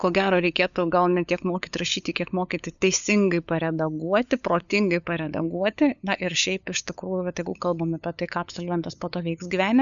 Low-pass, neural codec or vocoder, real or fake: 7.2 kHz; codec, 16 kHz, 8 kbps, FunCodec, trained on LibriTTS, 25 frames a second; fake